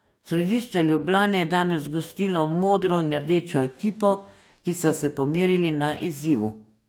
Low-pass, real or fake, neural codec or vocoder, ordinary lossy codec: 19.8 kHz; fake; codec, 44.1 kHz, 2.6 kbps, DAC; none